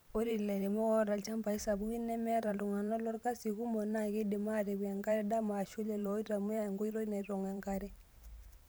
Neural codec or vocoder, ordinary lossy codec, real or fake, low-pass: vocoder, 44.1 kHz, 128 mel bands every 512 samples, BigVGAN v2; none; fake; none